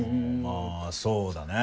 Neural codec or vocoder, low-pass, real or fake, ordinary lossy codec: none; none; real; none